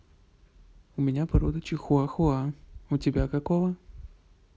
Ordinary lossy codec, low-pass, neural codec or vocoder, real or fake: none; none; none; real